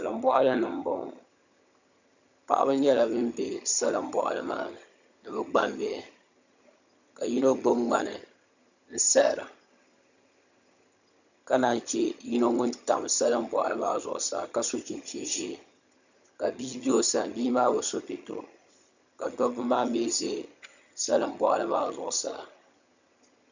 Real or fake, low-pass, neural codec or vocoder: fake; 7.2 kHz; vocoder, 22.05 kHz, 80 mel bands, HiFi-GAN